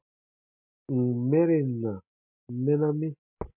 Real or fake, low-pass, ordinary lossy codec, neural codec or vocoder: real; 3.6 kHz; MP3, 32 kbps; none